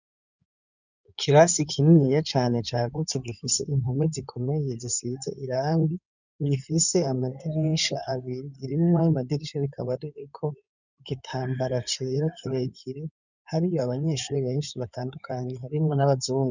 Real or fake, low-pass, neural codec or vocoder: fake; 7.2 kHz; codec, 16 kHz in and 24 kHz out, 2.2 kbps, FireRedTTS-2 codec